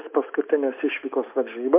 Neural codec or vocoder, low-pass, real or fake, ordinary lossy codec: none; 3.6 kHz; real; MP3, 24 kbps